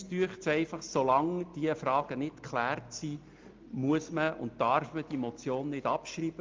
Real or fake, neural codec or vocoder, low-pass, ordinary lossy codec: real; none; 7.2 kHz; Opus, 32 kbps